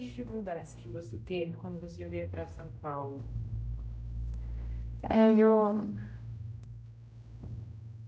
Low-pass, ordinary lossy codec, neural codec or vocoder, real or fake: none; none; codec, 16 kHz, 0.5 kbps, X-Codec, HuBERT features, trained on general audio; fake